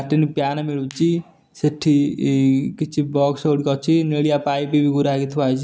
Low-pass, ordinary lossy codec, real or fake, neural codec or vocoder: none; none; real; none